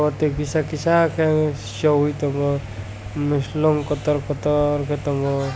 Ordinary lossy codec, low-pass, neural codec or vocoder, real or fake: none; none; none; real